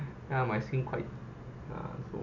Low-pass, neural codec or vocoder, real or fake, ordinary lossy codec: 7.2 kHz; none; real; none